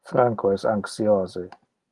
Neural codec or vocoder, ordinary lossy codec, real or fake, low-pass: none; Opus, 24 kbps; real; 10.8 kHz